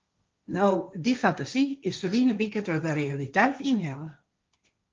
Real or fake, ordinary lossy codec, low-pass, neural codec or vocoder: fake; Opus, 24 kbps; 7.2 kHz; codec, 16 kHz, 1.1 kbps, Voila-Tokenizer